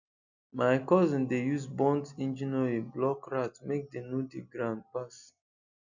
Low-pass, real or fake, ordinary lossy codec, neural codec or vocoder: 7.2 kHz; real; none; none